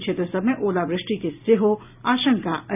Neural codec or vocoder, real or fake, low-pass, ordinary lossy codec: none; real; 3.6 kHz; none